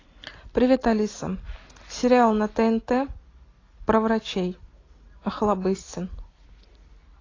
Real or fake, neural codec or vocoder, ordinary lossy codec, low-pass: real; none; AAC, 32 kbps; 7.2 kHz